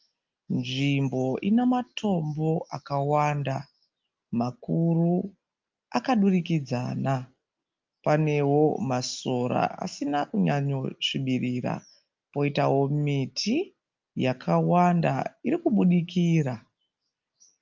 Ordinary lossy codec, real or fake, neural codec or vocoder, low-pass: Opus, 24 kbps; real; none; 7.2 kHz